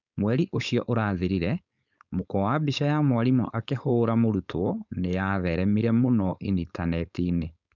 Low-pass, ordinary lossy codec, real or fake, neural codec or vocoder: 7.2 kHz; none; fake; codec, 16 kHz, 4.8 kbps, FACodec